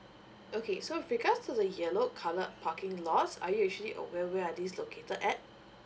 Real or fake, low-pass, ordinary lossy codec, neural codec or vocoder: real; none; none; none